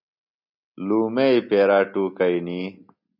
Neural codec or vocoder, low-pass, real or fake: none; 5.4 kHz; real